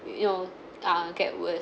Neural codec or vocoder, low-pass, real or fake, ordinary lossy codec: none; none; real; none